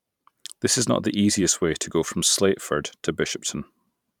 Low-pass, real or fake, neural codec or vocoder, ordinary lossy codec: 19.8 kHz; fake; vocoder, 44.1 kHz, 128 mel bands every 512 samples, BigVGAN v2; none